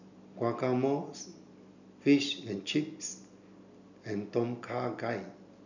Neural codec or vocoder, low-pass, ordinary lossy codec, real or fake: none; 7.2 kHz; none; real